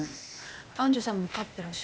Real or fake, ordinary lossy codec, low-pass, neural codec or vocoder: fake; none; none; codec, 16 kHz, 0.8 kbps, ZipCodec